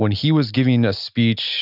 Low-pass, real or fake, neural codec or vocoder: 5.4 kHz; real; none